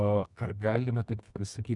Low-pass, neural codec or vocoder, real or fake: 10.8 kHz; codec, 24 kHz, 0.9 kbps, WavTokenizer, medium music audio release; fake